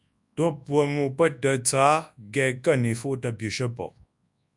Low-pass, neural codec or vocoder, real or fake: 10.8 kHz; codec, 24 kHz, 0.9 kbps, WavTokenizer, large speech release; fake